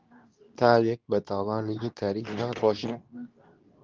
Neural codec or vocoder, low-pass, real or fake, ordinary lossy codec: codec, 24 kHz, 0.9 kbps, WavTokenizer, medium speech release version 2; 7.2 kHz; fake; Opus, 32 kbps